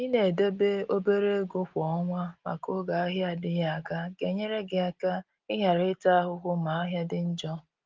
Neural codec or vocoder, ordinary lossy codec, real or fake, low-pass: none; Opus, 32 kbps; real; 7.2 kHz